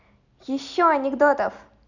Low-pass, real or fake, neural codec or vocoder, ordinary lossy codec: 7.2 kHz; real; none; none